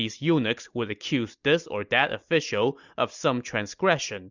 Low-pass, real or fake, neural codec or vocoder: 7.2 kHz; real; none